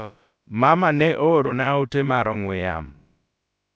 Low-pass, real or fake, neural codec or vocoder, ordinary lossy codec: none; fake; codec, 16 kHz, about 1 kbps, DyCAST, with the encoder's durations; none